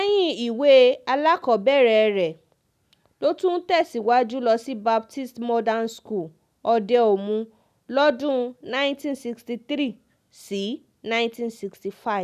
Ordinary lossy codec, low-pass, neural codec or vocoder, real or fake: none; 14.4 kHz; none; real